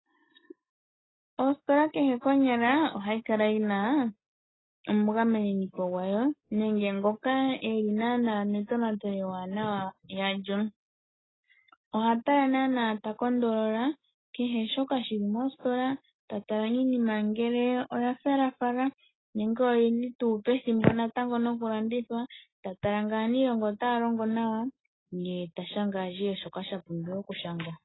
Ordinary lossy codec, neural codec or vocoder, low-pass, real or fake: AAC, 16 kbps; none; 7.2 kHz; real